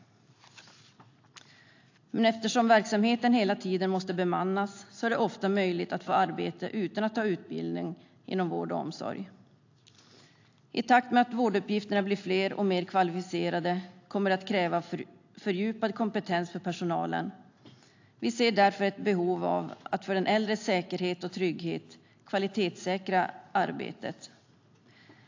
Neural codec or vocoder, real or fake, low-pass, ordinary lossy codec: none; real; 7.2 kHz; AAC, 48 kbps